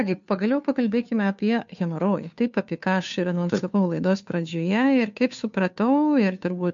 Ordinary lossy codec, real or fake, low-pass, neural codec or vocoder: MP3, 64 kbps; fake; 7.2 kHz; codec, 16 kHz, 2 kbps, FunCodec, trained on Chinese and English, 25 frames a second